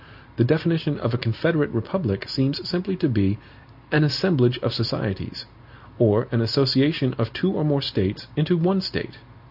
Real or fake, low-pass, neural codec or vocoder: real; 5.4 kHz; none